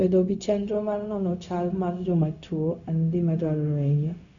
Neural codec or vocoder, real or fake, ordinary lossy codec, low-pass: codec, 16 kHz, 0.4 kbps, LongCat-Audio-Codec; fake; none; 7.2 kHz